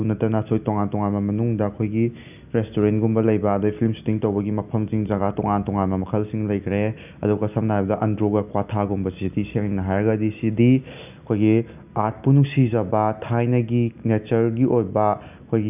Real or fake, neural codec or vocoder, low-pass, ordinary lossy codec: real; none; 3.6 kHz; none